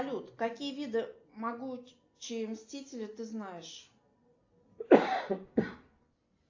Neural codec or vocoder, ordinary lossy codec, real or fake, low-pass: none; AAC, 48 kbps; real; 7.2 kHz